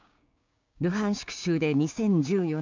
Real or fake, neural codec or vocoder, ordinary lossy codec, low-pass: fake; codec, 16 kHz, 6 kbps, DAC; MP3, 64 kbps; 7.2 kHz